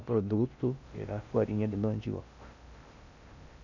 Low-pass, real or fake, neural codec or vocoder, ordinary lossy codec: 7.2 kHz; fake; codec, 16 kHz in and 24 kHz out, 0.6 kbps, FocalCodec, streaming, 2048 codes; none